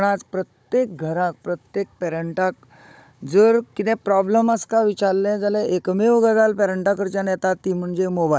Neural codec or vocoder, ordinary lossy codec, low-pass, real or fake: codec, 16 kHz, 16 kbps, FunCodec, trained on Chinese and English, 50 frames a second; none; none; fake